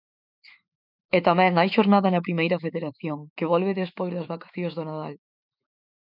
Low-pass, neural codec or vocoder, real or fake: 5.4 kHz; autoencoder, 48 kHz, 128 numbers a frame, DAC-VAE, trained on Japanese speech; fake